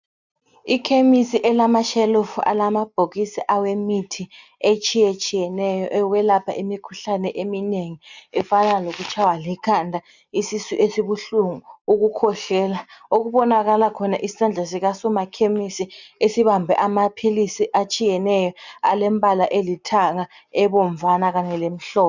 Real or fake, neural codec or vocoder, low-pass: real; none; 7.2 kHz